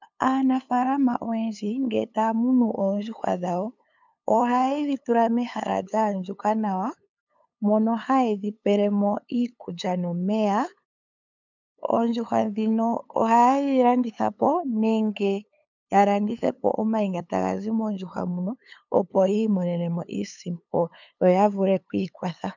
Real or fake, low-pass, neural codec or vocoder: fake; 7.2 kHz; codec, 16 kHz, 8 kbps, FunCodec, trained on LibriTTS, 25 frames a second